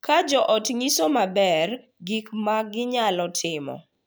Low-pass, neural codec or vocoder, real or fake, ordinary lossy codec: none; none; real; none